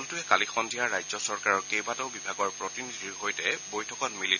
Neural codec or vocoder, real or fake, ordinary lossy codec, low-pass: none; real; none; 7.2 kHz